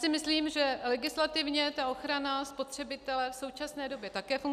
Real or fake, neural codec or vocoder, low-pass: real; none; 14.4 kHz